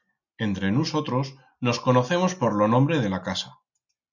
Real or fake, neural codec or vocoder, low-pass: real; none; 7.2 kHz